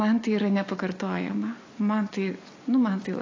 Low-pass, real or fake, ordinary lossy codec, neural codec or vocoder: 7.2 kHz; real; AAC, 32 kbps; none